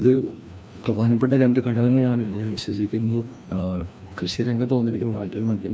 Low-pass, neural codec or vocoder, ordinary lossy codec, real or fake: none; codec, 16 kHz, 1 kbps, FreqCodec, larger model; none; fake